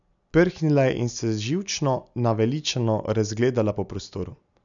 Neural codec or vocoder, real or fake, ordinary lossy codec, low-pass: none; real; none; 7.2 kHz